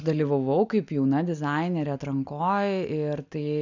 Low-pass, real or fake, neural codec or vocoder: 7.2 kHz; real; none